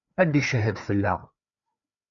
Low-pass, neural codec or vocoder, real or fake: 7.2 kHz; codec, 16 kHz, 4 kbps, FreqCodec, larger model; fake